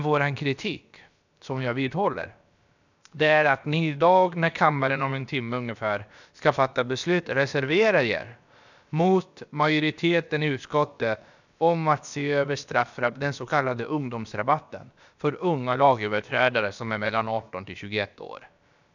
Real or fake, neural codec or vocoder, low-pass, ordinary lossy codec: fake; codec, 16 kHz, about 1 kbps, DyCAST, with the encoder's durations; 7.2 kHz; none